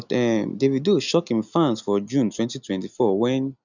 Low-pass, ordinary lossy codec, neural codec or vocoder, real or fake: 7.2 kHz; none; none; real